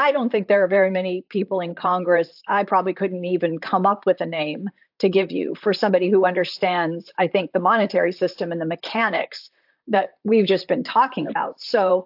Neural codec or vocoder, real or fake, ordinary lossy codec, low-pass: vocoder, 44.1 kHz, 128 mel bands, Pupu-Vocoder; fake; AAC, 48 kbps; 5.4 kHz